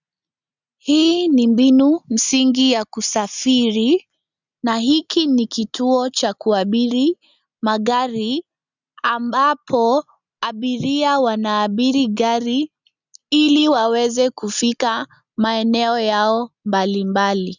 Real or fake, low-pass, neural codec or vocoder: real; 7.2 kHz; none